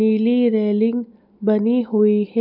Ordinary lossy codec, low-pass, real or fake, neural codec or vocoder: none; 5.4 kHz; real; none